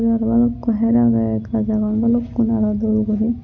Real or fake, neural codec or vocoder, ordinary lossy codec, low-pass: real; none; none; 7.2 kHz